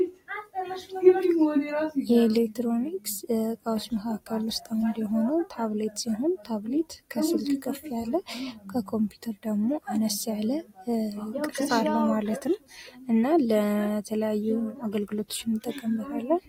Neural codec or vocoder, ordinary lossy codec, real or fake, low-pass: none; AAC, 48 kbps; real; 19.8 kHz